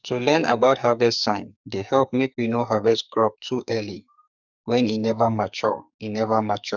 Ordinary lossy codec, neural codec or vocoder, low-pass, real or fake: none; codec, 44.1 kHz, 2.6 kbps, SNAC; 7.2 kHz; fake